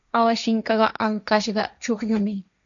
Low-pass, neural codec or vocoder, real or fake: 7.2 kHz; codec, 16 kHz, 1.1 kbps, Voila-Tokenizer; fake